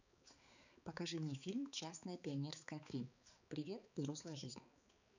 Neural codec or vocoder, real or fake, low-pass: codec, 16 kHz, 4 kbps, X-Codec, HuBERT features, trained on balanced general audio; fake; 7.2 kHz